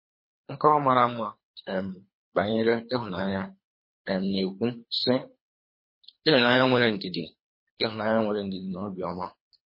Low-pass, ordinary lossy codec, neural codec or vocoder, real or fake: 5.4 kHz; MP3, 24 kbps; codec, 24 kHz, 3 kbps, HILCodec; fake